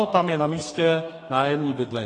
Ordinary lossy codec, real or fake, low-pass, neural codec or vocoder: AAC, 32 kbps; fake; 10.8 kHz; codec, 32 kHz, 1.9 kbps, SNAC